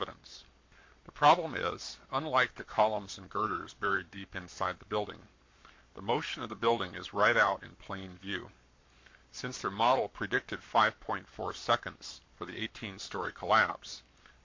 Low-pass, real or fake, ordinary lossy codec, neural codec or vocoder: 7.2 kHz; fake; MP3, 48 kbps; codec, 44.1 kHz, 7.8 kbps, Pupu-Codec